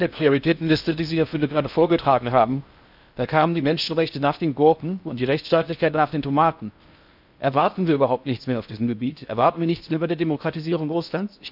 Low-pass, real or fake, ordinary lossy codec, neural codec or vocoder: 5.4 kHz; fake; none; codec, 16 kHz in and 24 kHz out, 0.6 kbps, FocalCodec, streaming, 4096 codes